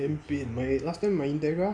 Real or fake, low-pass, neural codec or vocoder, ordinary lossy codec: real; 9.9 kHz; none; none